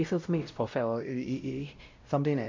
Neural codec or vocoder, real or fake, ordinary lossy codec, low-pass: codec, 16 kHz, 0.5 kbps, X-Codec, WavLM features, trained on Multilingual LibriSpeech; fake; MP3, 48 kbps; 7.2 kHz